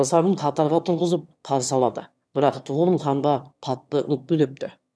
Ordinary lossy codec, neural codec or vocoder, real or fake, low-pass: none; autoencoder, 22.05 kHz, a latent of 192 numbers a frame, VITS, trained on one speaker; fake; none